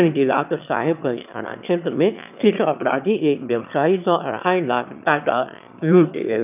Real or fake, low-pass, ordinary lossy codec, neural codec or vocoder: fake; 3.6 kHz; none; autoencoder, 22.05 kHz, a latent of 192 numbers a frame, VITS, trained on one speaker